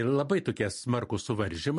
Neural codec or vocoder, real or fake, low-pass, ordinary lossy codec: none; real; 14.4 kHz; MP3, 48 kbps